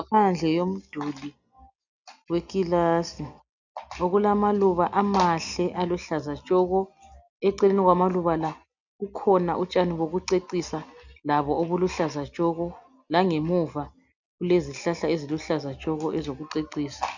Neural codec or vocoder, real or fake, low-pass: none; real; 7.2 kHz